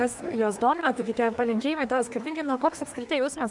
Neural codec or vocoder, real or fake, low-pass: codec, 24 kHz, 1 kbps, SNAC; fake; 10.8 kHz